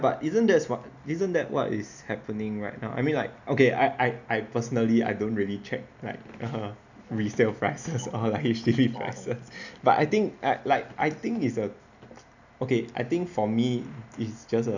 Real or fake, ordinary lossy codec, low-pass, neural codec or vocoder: real; none; 7.2 kHz; none